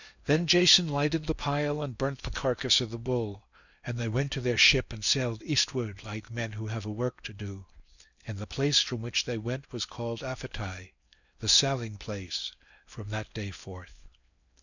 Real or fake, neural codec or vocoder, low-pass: fake; codec, 16 kHz in and 24 kHz out, 0.8 kbps, FocalCodec, streaming, 65536 codes; 7.2 kHz